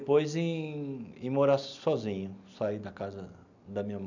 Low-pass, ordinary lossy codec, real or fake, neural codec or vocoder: 7.2 kHz; none; real; none